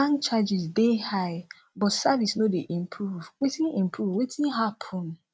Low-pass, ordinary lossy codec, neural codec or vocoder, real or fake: none; none; none; real